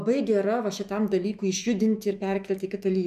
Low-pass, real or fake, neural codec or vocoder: 14.4 kHz; fake; autoencoder, 48 kHz, 128 numbers a frame, DAC-VAE, trained on Japanese speech